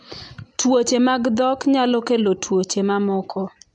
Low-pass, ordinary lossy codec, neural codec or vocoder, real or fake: 10.8 kHz; MP3, 64 kbps; none; real